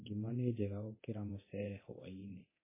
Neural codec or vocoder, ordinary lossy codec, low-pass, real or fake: codec, 24 kHz, 0.9 kbps, DualCodec; MP3, 16 kbps; 3.6 kHz; fake